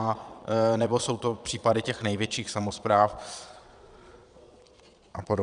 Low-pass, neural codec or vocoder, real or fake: 9.9 kHz; vocoder, 22.05 kHz, 80 mel bands, Vocos; fake